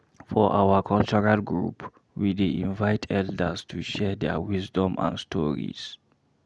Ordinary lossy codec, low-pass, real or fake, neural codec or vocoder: none; none; real; none